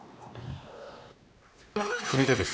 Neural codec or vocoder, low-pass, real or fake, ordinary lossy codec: codec, 16 kHz, 2 kbps, X-Codec, WavLM features, trained on Multilingual LibriSpeech; none; fake; none